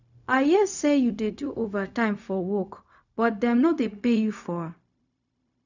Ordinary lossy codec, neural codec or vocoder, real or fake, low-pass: none; codec, 16 kHz, 0.4 kbps, LongCat-Audio-Codec; fake; 7.2 kHz